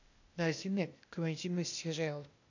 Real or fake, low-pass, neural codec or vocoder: fake; 7.2 kHz; codec, 16 kHz, 0.8 kbps, ZipCodec